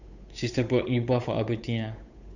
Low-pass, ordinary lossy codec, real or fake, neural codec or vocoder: 7.2 kHz; none; fake; codec, 16 kHz, 8 kbps, FunCodec, trained on Chinese and English, 25 frames a second